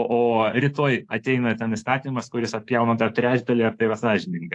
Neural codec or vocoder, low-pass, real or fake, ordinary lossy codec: codec, 24 kHz, 3.1 kbps, DualCodec; 10.8 kHz; fake; AAC, 48 kbps